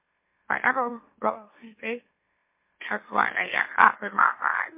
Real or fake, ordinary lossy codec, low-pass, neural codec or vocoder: fake; MP3, 24 kbps; 3.6 kHz; autoencoder, 44.1 kHz, a latent of 192 numbers a frame, MeloTTS